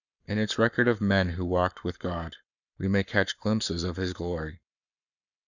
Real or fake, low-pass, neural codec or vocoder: fake; 7.2 kHz; codec, 44.1 kHz, 7.8 kbps, Pupu-Codec